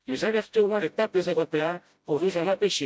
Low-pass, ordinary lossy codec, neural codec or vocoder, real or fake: none; none; codec, 16 kHz, 0.5 kbps, FreqCodec, smaller model; fake